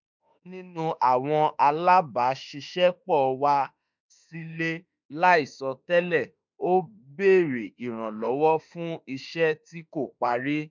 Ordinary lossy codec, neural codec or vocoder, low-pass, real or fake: MP3, 64 kbps; autoencoder, 48 kHz, 32 numbers a frame, DAC-VAE, trained on Japanese speech; 7.2 kHz; fake